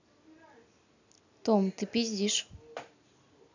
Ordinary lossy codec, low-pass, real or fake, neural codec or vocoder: none; 7.2 kHz; real; none